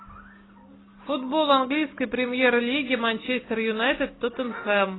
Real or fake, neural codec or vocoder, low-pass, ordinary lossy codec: real; none; 7.2 kHz; AAC, 16 kbps